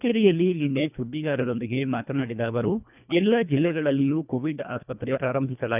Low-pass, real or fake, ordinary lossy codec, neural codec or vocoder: 3.6 kHz; fake; none; codec, 24 kHz, 1.5 kbps, HILCodec